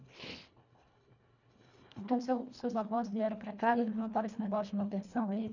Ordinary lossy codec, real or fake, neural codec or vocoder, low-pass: none; fake; codec, 24 kHz, 1.5 kbps, HILCodec; 7.2 kHz